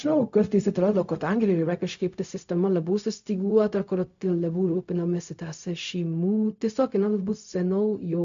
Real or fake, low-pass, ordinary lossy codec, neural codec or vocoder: fake; 7.2 kHz; MP3, 64 kbps; codec, 16 kHz, 0.4 kbps, LongCat-Audio-Codec